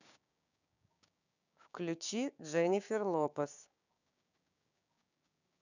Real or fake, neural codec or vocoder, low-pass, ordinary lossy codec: fake; codec, 16 kHz, 6 kbps, DAC; 7.2 kHz; none